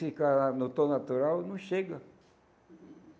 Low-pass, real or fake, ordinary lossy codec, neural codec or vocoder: none; real; none; none